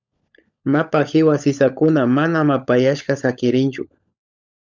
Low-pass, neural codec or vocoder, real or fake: 7.2 kHz; codec, 16 kHz, 16 kbps, FunCodec, trained on LibriTTS, 50 frames a second; fake